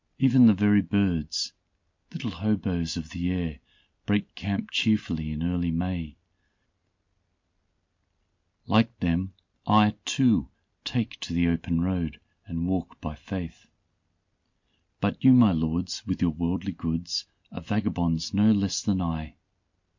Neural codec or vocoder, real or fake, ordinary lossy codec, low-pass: none; real; MP3, 48 kbps; 7.2 kHz